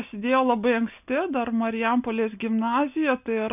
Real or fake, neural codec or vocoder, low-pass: real; none; 3.6 kHz